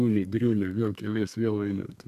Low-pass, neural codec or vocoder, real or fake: 14.4 kHz; codec, 32 kHz, 1.9 kbps, SNAC; fake